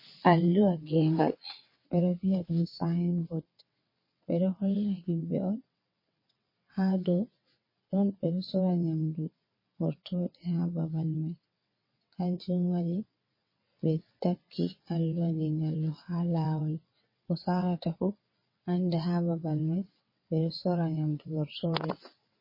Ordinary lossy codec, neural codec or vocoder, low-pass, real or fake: MP3, 24 kbps; vocoder, 22.05 kHz, 80 mel bands, WaveNeXt; 5.4 kHz; fake